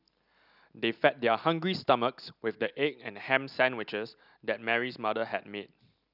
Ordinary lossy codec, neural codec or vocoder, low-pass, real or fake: none; none; 5.4 kHz; real